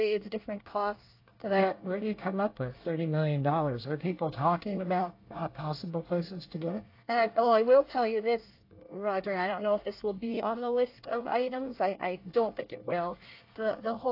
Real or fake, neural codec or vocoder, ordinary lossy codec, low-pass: fake; codec, 24 kHz, 1 kbps, SNAC; AAC, 32 kbps; 5.4 kHz